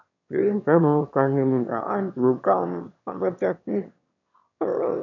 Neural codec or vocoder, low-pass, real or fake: autoencoder, 22.05 kHz, a latent of 192 numbers a frame, VITS, trained on one speaker; 7.2 kHz; fake